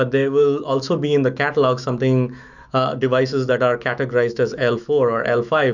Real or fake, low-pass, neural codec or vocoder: fake; 7.2 kHz; autoencoder, 48 kHz, 128 numbers a frame, DAC-VAE, trained on Japanese speech